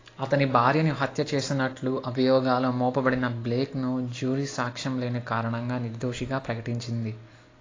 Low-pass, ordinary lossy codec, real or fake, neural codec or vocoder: 7.2 kHz; AAC, 32 kbps; real; none